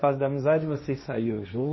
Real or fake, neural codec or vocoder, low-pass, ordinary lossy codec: fake; codec, 16 kHz, 1.1 kbps, Voila-Tokenizer; 7.2 kHz; MP3, 24 kbps